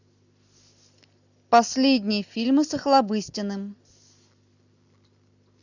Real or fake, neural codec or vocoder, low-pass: real; none; 7.2 kHz